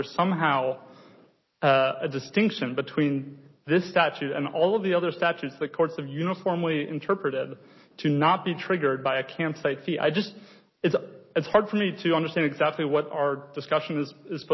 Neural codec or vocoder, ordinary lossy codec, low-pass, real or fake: none; MP3, 24 kbps; 7.2 kHz; real